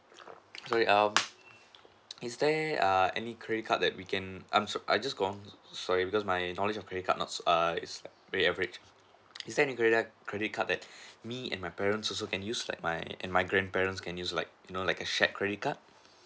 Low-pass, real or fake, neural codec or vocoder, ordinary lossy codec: none; real; none; none